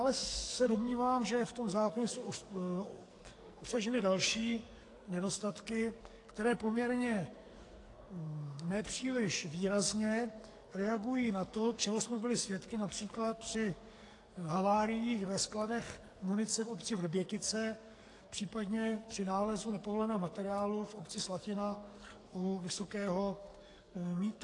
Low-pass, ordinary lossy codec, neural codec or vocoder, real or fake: 10.8 kHz; AAC, 48 kbps; codec, 44.1 kHz, 2.6 kbps, SNAC; fake